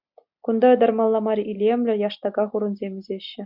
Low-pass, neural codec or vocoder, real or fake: 5.4 kHz; none; real